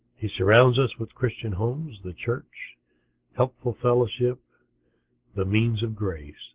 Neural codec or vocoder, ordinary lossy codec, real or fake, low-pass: none; Opus, 16 kbps; real; 3.6 kHz